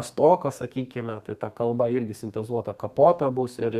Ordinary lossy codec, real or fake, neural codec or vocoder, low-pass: Opus, 64 kbps; fake; codec, 32 kHz, 1.9 kbps, SNAC; 14.4 kHz